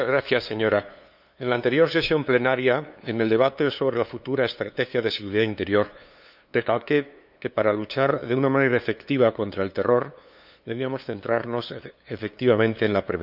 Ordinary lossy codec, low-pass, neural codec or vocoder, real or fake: none; 5.4 kHz; codec, 16 kHz, 2 kbps, FunCodec, trained on LibriTTS, 25 frames a second; fake